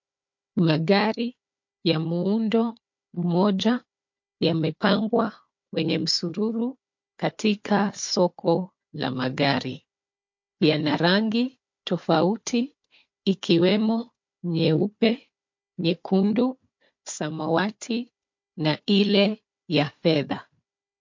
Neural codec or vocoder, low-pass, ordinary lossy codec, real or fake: codec, 16 kHz, 4 kbps, FunCodec, trained on Chinese and English, 50 frames a second; 7.2 kHz; MP3, 48 kbps; fake